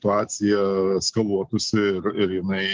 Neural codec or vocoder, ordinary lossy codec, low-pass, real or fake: none; Opus, 24 kbps; 7.2 kHz; real